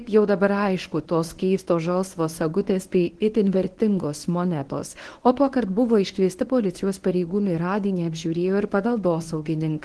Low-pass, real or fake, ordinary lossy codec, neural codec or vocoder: 10.8 kHz; fake; Opus, 16 kbps; codec, 24 kHz, 0.9 kbps, WavTokenizer, medium speech release version 1